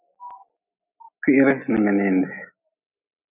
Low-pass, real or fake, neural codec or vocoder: 3.6 kHz; real; none